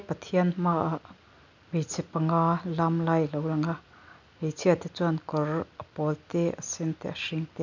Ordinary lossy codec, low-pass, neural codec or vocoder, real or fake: none; 7.2 kHz; none; real